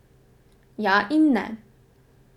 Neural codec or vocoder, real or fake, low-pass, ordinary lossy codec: none; real; 19.8 kHz; none